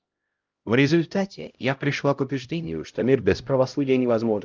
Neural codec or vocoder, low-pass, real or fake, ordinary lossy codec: codec, 16 kHz, 0.5 kbps, X-Codec, HuBERT features, trained on LibriSpeech; 7.2 kHz; fake; Opus, 24 kbps